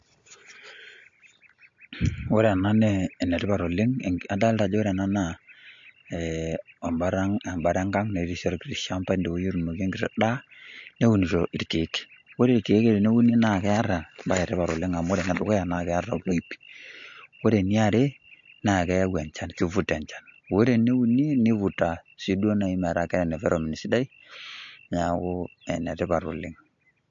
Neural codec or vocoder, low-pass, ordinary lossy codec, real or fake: none; 7.2 kHz; MP3, 48 kbps; real